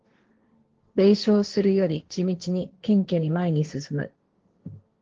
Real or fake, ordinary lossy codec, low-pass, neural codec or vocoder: fake; Opus, 16 kbps; 7.2 kHz; codec, 16 kHz, 1.1 kbps, Voila-Tokenizer